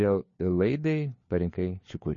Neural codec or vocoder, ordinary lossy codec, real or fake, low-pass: codec, 16 kHz, 4 kbps, FunCodec, trained on LibriTTS, 50 frames a second; MP3, 32 kbps; fake; 7.2 kHz